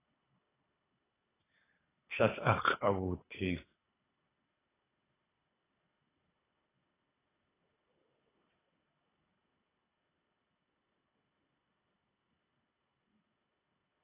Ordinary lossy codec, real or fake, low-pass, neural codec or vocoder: AAC, 16 kbps; fake; 3.6 kHz; codec, 24 kHz, 3 kbps, HILCodec